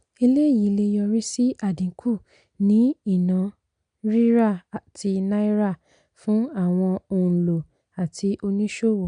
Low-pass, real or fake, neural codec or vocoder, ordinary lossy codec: 9.9 kHz; real; none; none